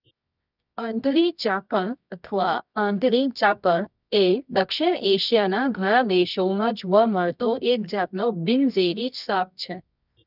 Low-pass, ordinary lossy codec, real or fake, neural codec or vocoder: 5.4 kHz; none; fake; codec, 24 kHz, 0.9 kbps, WavTokenizer, medium music audio release